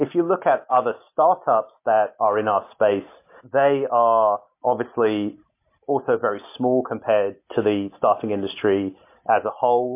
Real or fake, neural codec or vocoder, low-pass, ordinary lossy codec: real; none; 3.6 kHz; MP3, 24 kbps